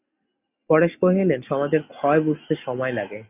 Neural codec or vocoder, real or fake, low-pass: none; real; 3.6 kHz